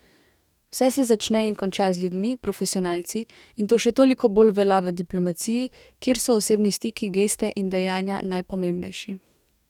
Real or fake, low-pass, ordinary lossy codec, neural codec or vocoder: fake; 19.8 kHz; none; codec, 44.1 kHz, 2.6 kbps, DAC